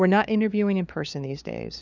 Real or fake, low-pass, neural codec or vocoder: fake; 7.2 kHz; codec, 16 kHz, 4 kbps, FunCodec, trained on LibriTTS, 50 frames a second